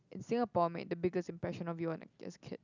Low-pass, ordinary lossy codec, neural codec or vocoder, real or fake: 7.2 kHz; none; none; real